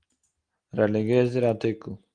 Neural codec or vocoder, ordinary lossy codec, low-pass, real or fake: none; Opus, 24 kbps; 9.9 kHz; real